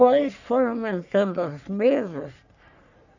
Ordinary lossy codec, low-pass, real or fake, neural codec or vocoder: none; 7.2 kHz; fake; codec, 44.1 kHz, 3.4 kbps, Pupu-Codec